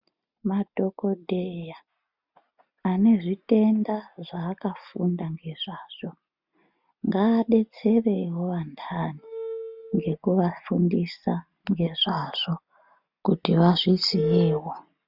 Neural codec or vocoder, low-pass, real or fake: none; 5.4 kHz; real